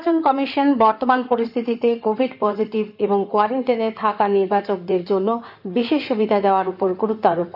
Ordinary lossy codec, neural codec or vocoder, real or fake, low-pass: none; codec, 16 kHz in and 24 kHz out, 2.2 kbps, FireRedTTS-2 codec; fake; 5.4 kHz